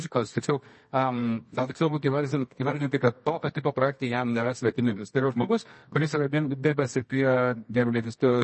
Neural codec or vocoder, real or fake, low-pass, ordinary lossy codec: codec, 24 kHz, 0.9 kbps, WavTokenizer, medium music audio release; fake; 10.8 kHz; MP3, 32 kbps